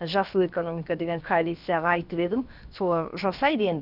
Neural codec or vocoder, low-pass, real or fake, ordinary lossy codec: codec, 16 kHz, about 1 kbps, DyCAST, with the encoder's durations; 5.4 kHz; fake; none